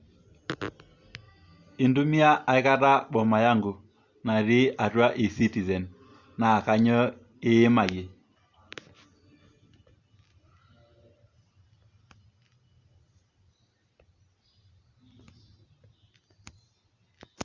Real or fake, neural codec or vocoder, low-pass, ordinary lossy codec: real; none; 7.2 kHz; Opus, 64 kbps